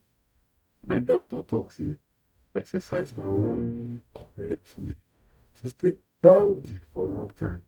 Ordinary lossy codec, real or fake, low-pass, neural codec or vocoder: none; fake; 19.8 kHz; codec, 44.1 kHz, 0.9 kbps, DAC